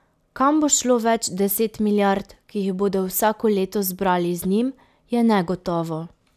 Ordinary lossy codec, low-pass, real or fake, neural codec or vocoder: none; 14.4 kHz; real; none